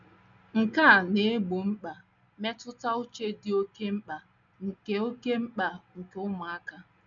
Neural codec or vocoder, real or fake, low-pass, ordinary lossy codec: none; real; 7.2 kHz; none